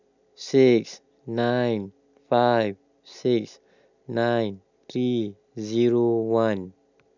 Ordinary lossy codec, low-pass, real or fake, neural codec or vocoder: none; 7.2 kHz; real; none